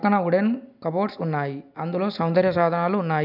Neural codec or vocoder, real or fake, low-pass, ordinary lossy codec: none; real; 5.4 kHz; none